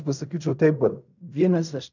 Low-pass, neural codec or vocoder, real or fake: 7.2 kHz; codec, 16 kHz in and 24 kHz out, 0.4 kbps, LongCat-Audio-Codec, fine tuned four codebook decoder; fake